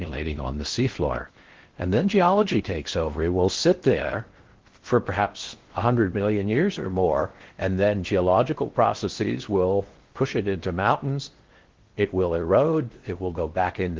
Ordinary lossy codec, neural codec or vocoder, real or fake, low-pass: Opus, 16 kbps; codec, 16 kHz in and 24 kHz out, 0.6 kbps, FocalCodec, streaming, 4096 codes; fake; 7.2 kHz